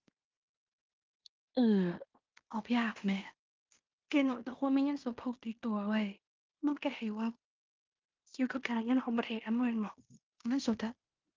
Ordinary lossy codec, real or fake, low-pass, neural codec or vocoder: Opus, 32 kbps; fake; 7.2 kHz; codec, 16 kHz in and 24 kHz out, 0.9 kbps, LongCat-Audio-Codec, fine tuned four codebook decoder